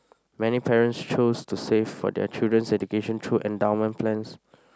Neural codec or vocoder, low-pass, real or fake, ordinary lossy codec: none; none; real; none